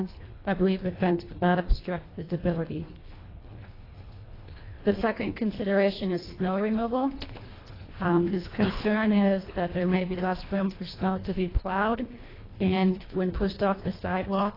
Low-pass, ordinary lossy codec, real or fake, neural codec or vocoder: 5.4 kHz; AAC, 24 kbps; fake; codec, 24 kHz, 1.5 kbps, HILCodec